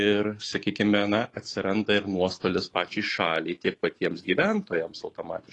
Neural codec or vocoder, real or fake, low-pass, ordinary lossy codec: autoencoder, 48 kHz, 128 numbers a frame, DAC-VAE, trained on Japanese speech; fake; 10.8 kHz; AAC, 32 kbps